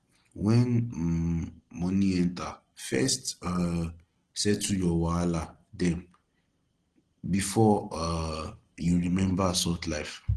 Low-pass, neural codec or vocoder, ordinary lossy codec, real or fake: 9.9 kHz; none; Opus, 16 kbps; real